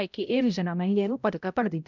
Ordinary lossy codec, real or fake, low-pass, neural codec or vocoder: none; fake; 7.2 kHz; codec, 16 kHz, 0.5 kbps, X-Codec, HuBERT features, trained on balanced general audio